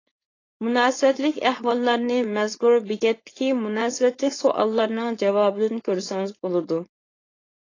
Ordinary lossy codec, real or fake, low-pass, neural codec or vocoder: AAC, 32 kbps; fake; 7.2 kHz; codec, 16 kHz, 4.8 kbps, FACodec